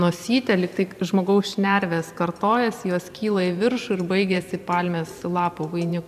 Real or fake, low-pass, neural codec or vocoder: fake; 14.4 kHz; vocoder, 44.1 kHz, 128 mel bands every 512 samples, BigVGAN v2